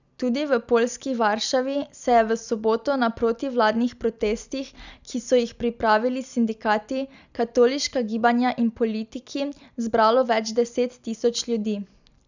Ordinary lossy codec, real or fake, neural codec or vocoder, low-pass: none; real; none; 7.2 kHz